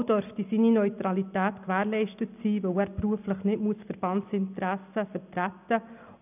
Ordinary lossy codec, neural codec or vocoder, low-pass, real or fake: none; none; 3.6 kHz; real